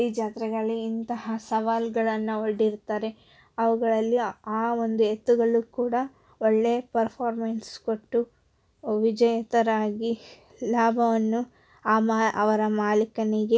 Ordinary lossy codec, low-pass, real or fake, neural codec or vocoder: none; none; real; none